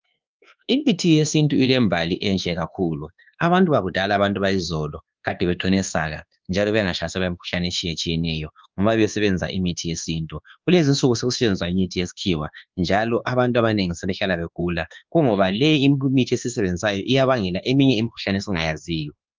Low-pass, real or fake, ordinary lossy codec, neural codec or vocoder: 7.2 kHz; fake; Opus, 32 kbps; codec, 24 kHz, 1.2 kbps, DualCodec